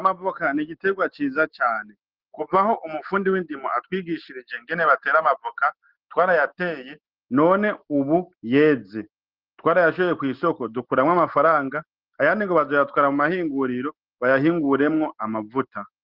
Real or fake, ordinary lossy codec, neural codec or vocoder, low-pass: real; Opus, 16 kbps; none; 5.4 kHz